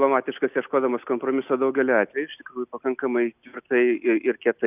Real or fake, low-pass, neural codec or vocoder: real; 3.6 kHz; none